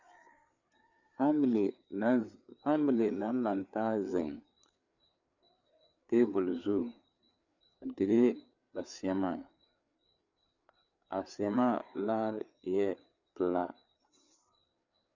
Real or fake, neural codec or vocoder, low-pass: fake; codec, 16 kHz, 4 kbps, FreqCodec, larger model; 7.2 kHz